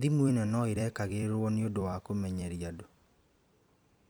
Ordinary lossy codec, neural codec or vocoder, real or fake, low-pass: none; vocoder, 44.1 kHz, 128 mel bands every 256 samples, BigVGAN v2; fake; none